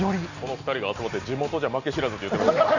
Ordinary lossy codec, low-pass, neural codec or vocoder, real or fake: none; 7.2 kHz; none; real